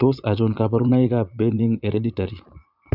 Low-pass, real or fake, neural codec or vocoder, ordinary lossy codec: 5.4 kHz; fake; vocoder, 22.05 kHz, 80 mel bands, Vocos; none